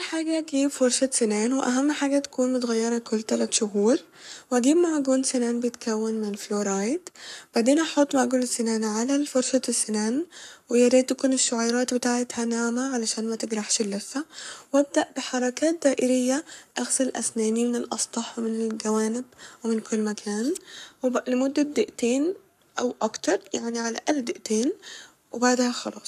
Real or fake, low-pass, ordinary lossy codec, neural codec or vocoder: fake; 14.4 kHz; none; codec, 44.1 kHz, 7.8 kbps, Pupu-Codec